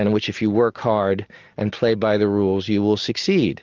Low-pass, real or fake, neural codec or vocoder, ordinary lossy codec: 7.2 kHz; real; none; Opus, 24 kbps